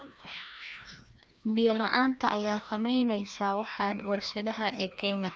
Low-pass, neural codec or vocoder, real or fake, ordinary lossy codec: none; codec, 16 kHz, 1 kbps, FreqCodec, larger model; fake; none